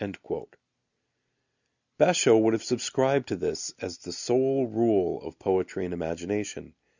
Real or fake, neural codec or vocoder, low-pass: real; none; 7.2 kHz